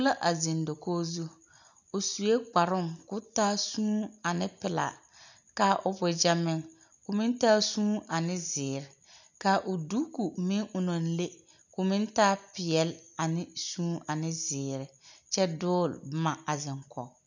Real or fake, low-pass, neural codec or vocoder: real; 7.2 kHz; none